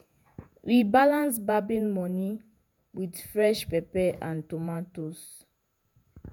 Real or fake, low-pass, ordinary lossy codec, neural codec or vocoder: fake; none; none; vocoder, 48 kHz, 128 mel bands, Vocos